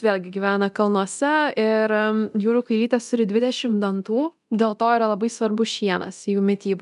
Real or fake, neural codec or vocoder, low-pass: fake; codec, 24 kHz, 0.9 kbps, DualCodec; 10.8 kHz